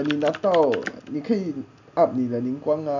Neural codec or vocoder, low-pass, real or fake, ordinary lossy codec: none; 7.2 kHz; real; none